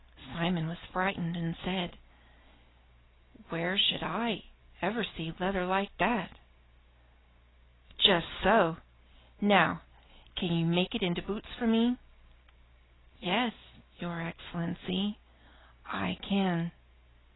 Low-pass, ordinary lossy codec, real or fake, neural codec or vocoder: 7.2 kHz; AAC, 16 kbps; real; none